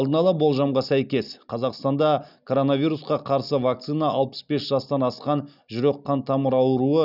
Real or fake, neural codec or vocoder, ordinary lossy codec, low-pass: real; none; none; 5.4 kHz